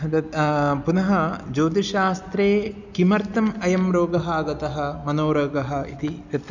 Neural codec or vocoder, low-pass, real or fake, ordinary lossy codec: none; 7.2 kHz; real; none